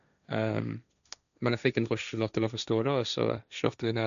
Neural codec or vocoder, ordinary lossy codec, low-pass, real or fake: codec, 16 kHz, 1.1 kbps, Voila-Tokenizer; none; 7.2 kHz; fake